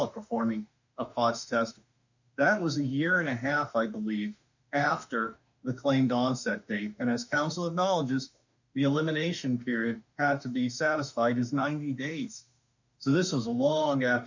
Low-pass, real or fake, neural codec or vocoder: 7.2 kHz; fake; autoencoder, 48 kHz, 32 numbers a frame, DAC-VAE, trained on Japanese speech